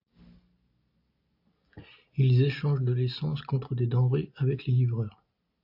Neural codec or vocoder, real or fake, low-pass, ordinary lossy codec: none; real; 5.4 kHz; AAC, 48 kbps